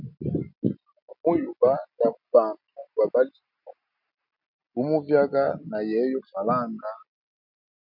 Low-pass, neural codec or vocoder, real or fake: 5.4 kHz; none; real